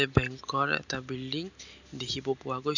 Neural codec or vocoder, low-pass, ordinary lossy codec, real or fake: none; 7.2 kHz; none; real